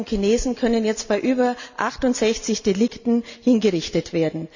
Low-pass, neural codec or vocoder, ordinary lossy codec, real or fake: 7.2 kHz; none; none; real